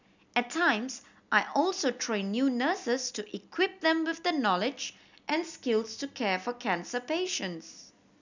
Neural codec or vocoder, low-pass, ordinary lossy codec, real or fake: none; 7.2 kHz; none; real